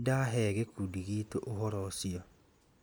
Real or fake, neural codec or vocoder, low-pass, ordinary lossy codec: real; none; none; none